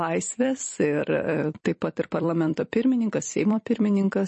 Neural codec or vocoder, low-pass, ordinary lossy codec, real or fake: vocoder, 44.1 kHz, 128 mel bands every 256 samples, BigVGAN v2; 10.8 kHz; MP3, 32 kbps; fake